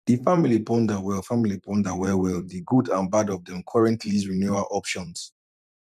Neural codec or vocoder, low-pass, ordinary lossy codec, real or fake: vocoder, 44.1 kHz, 128 mel bands every 512 samples, BigVGAN v2; 14.4 kHz; none; fake